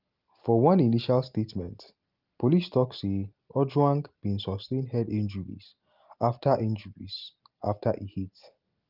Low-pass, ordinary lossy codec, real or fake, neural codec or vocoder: 5.4 kHz; Opus, 32 kbps; real; none